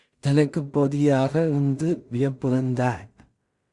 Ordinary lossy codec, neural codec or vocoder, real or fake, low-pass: Opus, 64 kbps; codec, 16 kHz in and 24 kHz out, 0.4 kbps, LongCat-Audio-Codec, two codebook decoder; fake; 10.8 kHz